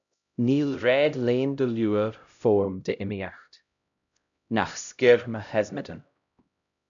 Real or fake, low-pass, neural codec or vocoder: fake; 7.2 kHz; codec, 16 kHz, 0.5 kbps, X-Codec, HuBERT features, trained on LibriSpeech